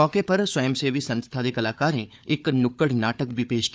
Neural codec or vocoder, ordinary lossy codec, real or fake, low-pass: codec, 16 kHz, 4 kbps, FunCodec, trained on Chinese and English, 50 frames a second; none; fake; none